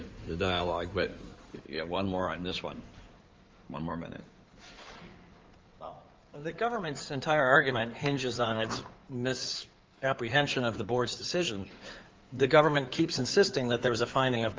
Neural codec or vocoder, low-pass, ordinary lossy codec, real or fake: codec, 16 kHz in and 24 kHz out, 2.2 kbps, FireRedTTS-2 codec; 7.2 kHz; Opus, 32 kbps; fake